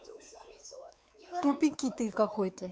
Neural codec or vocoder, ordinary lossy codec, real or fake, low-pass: codec, 16 kHz, 4 kbps, X-Codec, WavLM features, trained on Multilingual LibriSpeech; none; fake; none